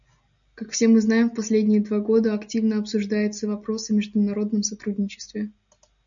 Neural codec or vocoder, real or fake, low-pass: none; real; 7.2 kHz